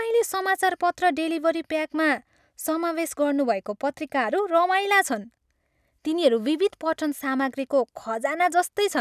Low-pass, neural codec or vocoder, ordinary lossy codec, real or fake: 14.4 kHz; none; none; real